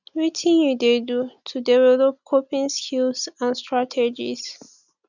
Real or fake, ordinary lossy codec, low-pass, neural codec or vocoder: real; none; 7.2 kHz; none